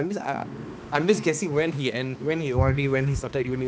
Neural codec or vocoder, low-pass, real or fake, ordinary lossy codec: codec, 16 kHz, 2 kbps, X-Codec, HuBERT features, trained on balanced general audio; none; fake; none